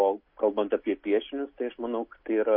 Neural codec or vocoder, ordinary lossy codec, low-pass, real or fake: none; MP3, 32 kbps; 5.4 kHz; real